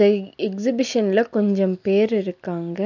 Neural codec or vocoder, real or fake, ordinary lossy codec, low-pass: none; real; none; 7.2 kHz